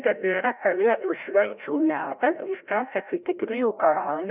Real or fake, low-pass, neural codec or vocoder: fake; 3.6 kHz; codec, 16 kHz, 0.5 kbps, FreqCodec, larger model